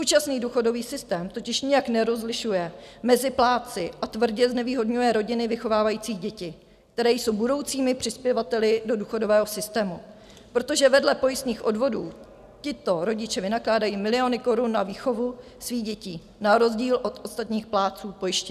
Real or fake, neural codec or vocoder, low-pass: real; none; 14.4 kHz